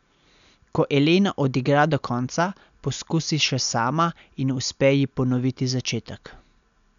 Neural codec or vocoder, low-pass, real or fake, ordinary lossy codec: none; 7.2 kHz; real; none